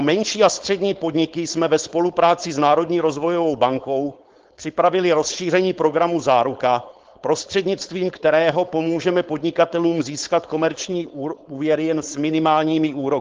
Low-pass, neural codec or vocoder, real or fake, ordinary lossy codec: 7.2 kHz; codec, 16 kHz, 4.8 kbps, FACodec; fake; Opus, 16 kbps